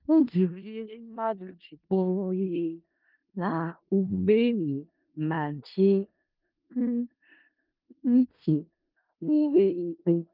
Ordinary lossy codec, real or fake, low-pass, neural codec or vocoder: Opus, 24 kbps; fake; 5.4 kHz; codec, 16 kHz in and 24 kHz out, 0.4 kbps, LongCat-Audio-Codec, four codebook decoder